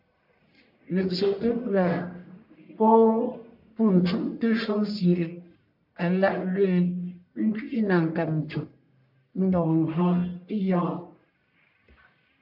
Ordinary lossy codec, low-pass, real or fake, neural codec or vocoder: MP3, 48 kbps; 5.4 kHz; fake; codec, 44.1 kHz, 1.7 kbps, Pupu-Codec